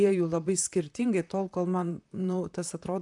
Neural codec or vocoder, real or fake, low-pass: vocoder, 44.1 kHz, 128 mel bands, Pupu-Vocoder; fake; 10.8 kHz